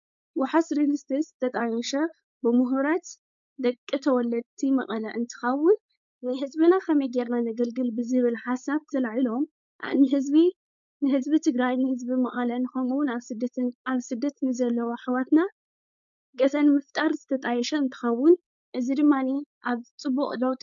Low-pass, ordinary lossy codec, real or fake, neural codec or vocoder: 7.2 kHz; MP3, 96 kbps; fake; codec, 16 kHz, 4.8 kbps, FACodec